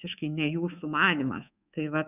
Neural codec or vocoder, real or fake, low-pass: codec, 24 kHz, 3.1 kbps, DualCodec; fake; 3.6 kHz